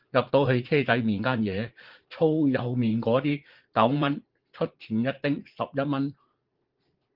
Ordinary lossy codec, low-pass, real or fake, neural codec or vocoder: Opus, 16 kbps; 5.4 kHz; fake; vocoder, 44.1 kHz, 80 mel bands, Vocos